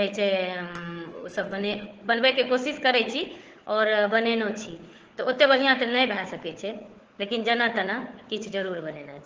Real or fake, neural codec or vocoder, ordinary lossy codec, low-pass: fake; codec, 16 kHz, 4 kbps, FunCodec, trained on Chinese and English, 50 frames a second; Opus, 16 kbps; 7.2 kHz